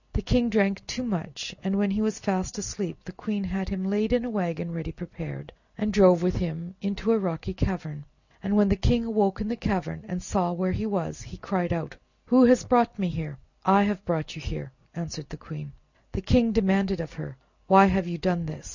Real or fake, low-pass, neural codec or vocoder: real; 7.2 kHz; none